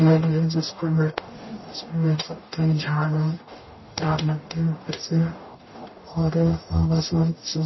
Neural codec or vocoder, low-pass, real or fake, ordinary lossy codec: codec, 44.1 kHz, 0.9 kbps, DAC; 7.2 kHz; fake; MP3, 24 kbps